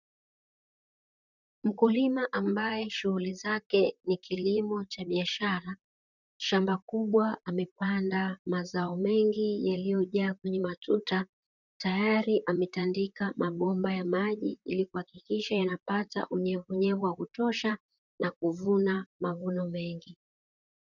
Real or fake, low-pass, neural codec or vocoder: fake; 7.2 kHz; vocoder, 44.1 kHz, 128 mel bands, Pupu-Vocoder